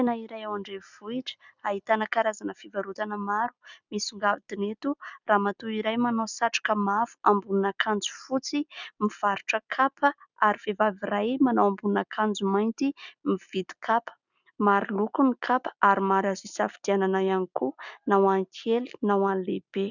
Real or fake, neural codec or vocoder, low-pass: real; none; 7.2 kHz